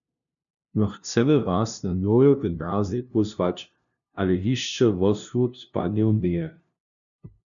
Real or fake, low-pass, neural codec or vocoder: fake; 7.2 kHz; codec, 16 kHz, 0.5 kbps, FunCodec, trained on LibriTTS, 25 frames a second